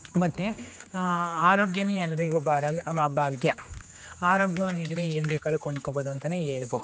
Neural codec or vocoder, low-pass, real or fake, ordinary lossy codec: codec, 16 kHz, 2 kbps, X-Codec, HuBERT features, trained on general audio; none; fake; none